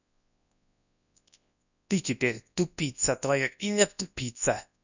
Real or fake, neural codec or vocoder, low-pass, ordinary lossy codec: fake; codec, 24 kHz, 0.9 kbps, WavTokenizer, large speech release; 7.2 kHz; none